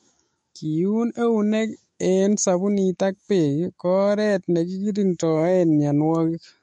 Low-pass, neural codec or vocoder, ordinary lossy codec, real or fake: 19.8 kHz; autoencoder, 48 kHz, 128 numbers a frame, DAC-VAE, trained on Japanese speech; MP3, 48 kbps; fake